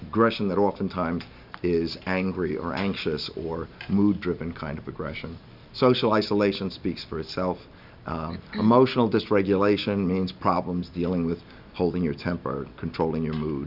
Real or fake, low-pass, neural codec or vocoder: real; 5.4 kHz; none